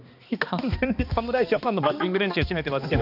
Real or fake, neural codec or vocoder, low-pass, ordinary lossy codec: fake; codec, 16 kHz, 2 kbps, X-Codec, HuBERT features, trained on balanced general audio; 5.4 kHz; none